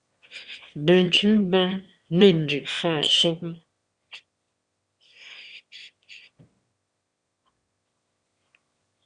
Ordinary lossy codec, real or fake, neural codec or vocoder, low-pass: Opus, 64 kbps; fake; autoencoder, 22.05 kHz, a latent of 192 numbers a frame, VITS, trained on one speaker; 9.9 kHz